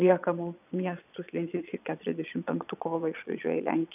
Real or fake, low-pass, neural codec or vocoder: fake; 3.6 kHz; vocoder, 22.05 kHz, 80 mel bands, Vocos